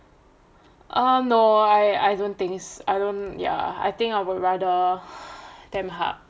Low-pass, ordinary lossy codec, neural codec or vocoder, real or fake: none; none; none; real